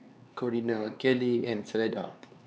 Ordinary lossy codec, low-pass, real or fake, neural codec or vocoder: none; none; fake; codec, 16 kHz, 4 kbps, X-Codec, HuBERT features, trained on LibriSpeech